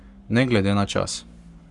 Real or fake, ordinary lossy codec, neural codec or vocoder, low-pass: fake; Opus, 64 kbps; codec, 44.1 kHz, 7.8 kbps, Pupu-Codec; 10.8 kHz